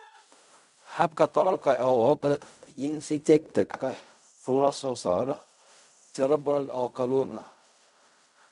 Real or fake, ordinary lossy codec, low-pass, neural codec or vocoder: fake; none; 10.8 kHz; codec, 16 kHz in and 24 kHz out, 0.4 kbps, LongCat-Audio-Codec, fine tuned four codebook decoder